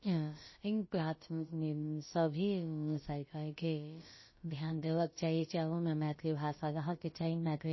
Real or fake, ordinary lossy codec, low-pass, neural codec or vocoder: fake; MP3, 24 kbps; 7.2 kHz; codec, 16 kHz, about 1 kbps, DyCAST, with the encoder's durations